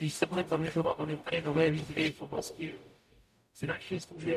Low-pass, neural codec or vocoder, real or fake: 14.4 kHz; codec, 44.1 kHz, 0.9 kbps, DAC; fake